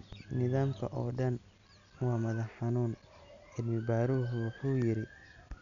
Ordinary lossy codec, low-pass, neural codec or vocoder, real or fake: none; 7.2 kHz; none; real